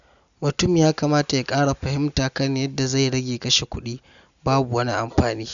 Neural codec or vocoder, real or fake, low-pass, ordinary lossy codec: none; real; 7.2 kHz; none